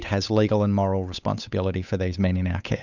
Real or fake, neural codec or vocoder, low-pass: fake; codec, 16 kHz, 8 kbps, FunCodec, trained on Chinese and English, 25 frames a second; 7.2 kHz